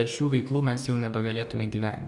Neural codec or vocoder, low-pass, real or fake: codec, 44.1 kHz, 2.6 kbps, DAC; 10.8 kHz; fake